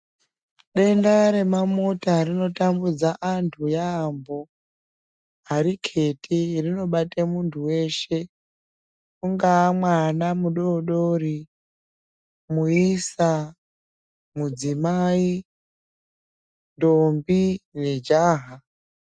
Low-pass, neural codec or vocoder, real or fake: 9.9 kHz; none; real